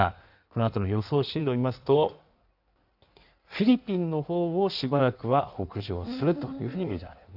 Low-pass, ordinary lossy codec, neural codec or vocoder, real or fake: 5.4 kHz; none; codec, 16 kHz in and 24 kHz out, 1.1 kbps, FireRedTTS-2 codec; fake